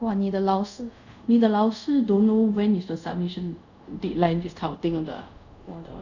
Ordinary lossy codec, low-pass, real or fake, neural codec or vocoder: none; 7.2 kHz; fake; codec, 24 kHz, 0.5 kbps, DualCodec